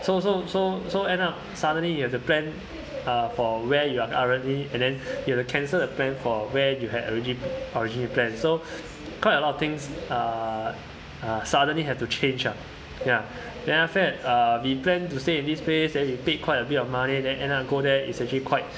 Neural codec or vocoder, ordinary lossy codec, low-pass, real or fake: none; none; none; real